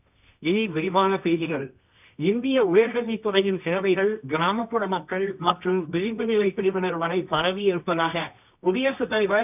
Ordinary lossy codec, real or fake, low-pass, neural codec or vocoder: none; fake; 3.6 kHz; codec, 24 kHz, 0.9 kbps, WavTokenizer, medium music audio release